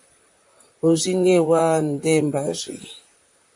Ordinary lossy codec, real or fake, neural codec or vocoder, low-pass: AAC, 64 kbps; fake; vocoder, 44.1 kHz, 128 mel bands, Pupu-Vocoder; 10.8 kHz